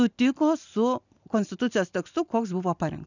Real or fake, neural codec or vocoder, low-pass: real; none; 7.2 kHz